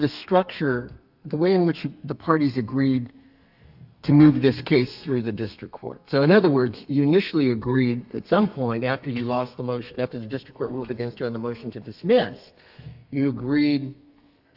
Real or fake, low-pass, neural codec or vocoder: fake; 5.4 kHz; codec, 32 kHz, 1.9 kbps, SNAC